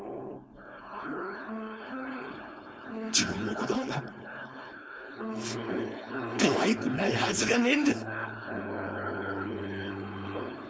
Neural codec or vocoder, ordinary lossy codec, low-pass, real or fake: codec, 16 kHz, 4.8 kbps, FACodec; none; none; fake